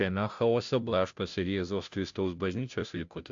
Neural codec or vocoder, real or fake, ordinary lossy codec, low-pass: codec, 16 kHz, 0.5 kbps, FunCodec, trained on Chinese and English, 25 frames a second; fake; AAC, 64 kbps; 7.2 kHz